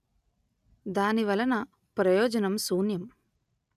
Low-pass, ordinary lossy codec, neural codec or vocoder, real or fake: 14.4 kHz; none; none; real